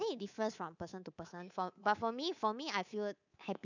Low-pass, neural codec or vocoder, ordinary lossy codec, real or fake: 7.2 kHz; codec, 24 kHz, 3.1 kbps, DualCodec; none; fake